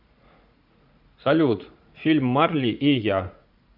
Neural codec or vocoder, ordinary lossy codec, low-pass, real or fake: vocoder, 44.1 kHz, 80 mel bands, Vocos; AAC, 48 kbps; 5.4 kHz; fake